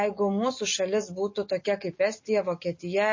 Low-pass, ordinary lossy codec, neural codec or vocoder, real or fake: 7.2 kHz; MP3, 32 kbps; none; real